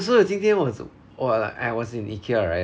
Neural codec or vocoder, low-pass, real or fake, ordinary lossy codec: none; none; real; none